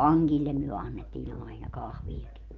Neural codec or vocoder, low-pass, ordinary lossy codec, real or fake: none; 7.2 kHz; Opus, 24 kbps; real